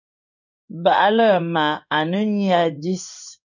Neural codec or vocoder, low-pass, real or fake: codec, 16 kHz in and 24 kHz out, 1 kbps, XY-Tokenizer; 7.2 kHz; fake